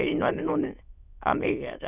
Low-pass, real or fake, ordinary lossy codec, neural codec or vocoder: 3.6 kHz; fake; none; autoencoder, 22.05 kHz, a latent of 192 numbers a frame, VITS, trained on many speakers